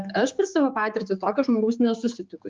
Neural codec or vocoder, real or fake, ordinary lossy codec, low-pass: codec, 16 kHz, 4 kbps, X-Codec, HuBERT features, trained on general audio; fake; Opus, 24 kbps; 7.2 kHz